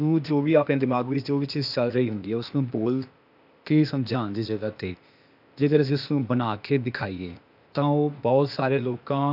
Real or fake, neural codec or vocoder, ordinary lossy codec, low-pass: fake; codec, 16 kHz, 0.8 kbps, ZipCodec; none; 5.4 kHz